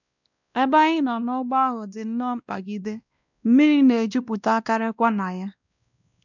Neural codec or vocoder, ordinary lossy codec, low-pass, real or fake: codec, 16 kHz, 1 kbps, X-Codec, WavLM features, trained on Multilingual LibriSpeech; none; 7.2 kHz; fake